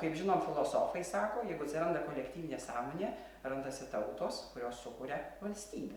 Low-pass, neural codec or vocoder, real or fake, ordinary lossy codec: 19.8 kHz; none; real; Opus, 64 kbps